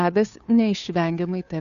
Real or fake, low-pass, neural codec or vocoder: fake; 7.2 kHz; codec, 16 kHz, 2 kbps, FunCodec, trained on Chinese and English, 25 frames a second